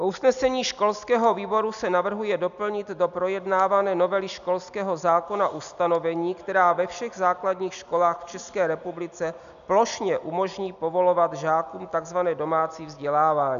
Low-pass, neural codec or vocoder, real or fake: 7.2 kHz; none; real